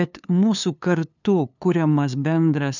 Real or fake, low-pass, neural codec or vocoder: fake; 7.2 kHz; codec, 16 kHz, 2 kbps, FunCodec, trained on LibriTTS, 25 frames a second